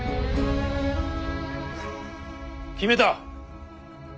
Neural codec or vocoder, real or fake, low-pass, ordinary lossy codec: none; real; none; none